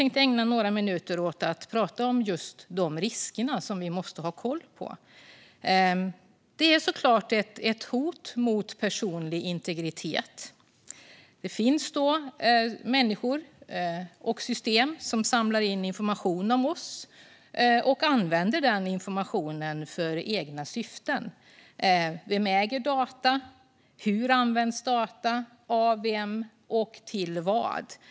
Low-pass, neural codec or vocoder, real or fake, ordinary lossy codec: none; none; real; none